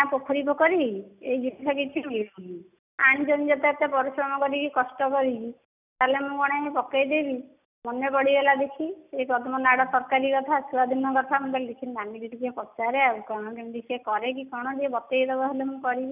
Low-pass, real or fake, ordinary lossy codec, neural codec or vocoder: 3.6 kHz; real; none; none